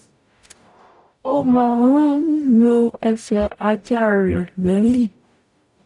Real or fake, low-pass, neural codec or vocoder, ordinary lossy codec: fake; 10.8 kHz; codec, 44.1 kHz, 0.9 kbps, DAC; none